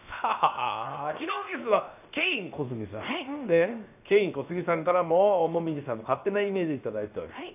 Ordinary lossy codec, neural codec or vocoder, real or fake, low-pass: none; codec, 16 kHz, 0.7 kbps, FocalCodec; fake; 3.6 kHz